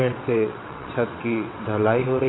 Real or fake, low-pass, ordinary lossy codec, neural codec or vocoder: fake; 7.2 kHz; AAC, 16 kbps; vocoder, 22.05 kHz, 80 mel bands, WaveNeXt